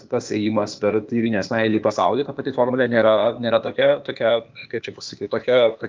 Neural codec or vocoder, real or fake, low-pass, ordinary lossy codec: codec, 16 kHz, 0.8 kbps, ZipCodec; fake; 7.2 kHz; Opus, 24 kbps